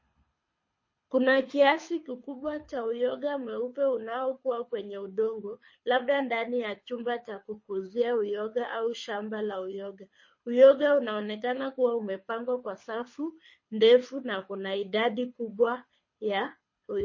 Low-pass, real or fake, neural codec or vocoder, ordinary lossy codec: 7.2 kHz; fake; codec, 24 kHz, 6 kbps, HILCodec; MP3, 32 kbps